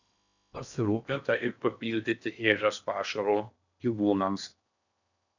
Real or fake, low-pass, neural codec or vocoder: fake; 7.2 kHz; codec, 16 kHz in and 24 kHz out, 0.8 kbps, FocalCodec, streaming, 65536 codes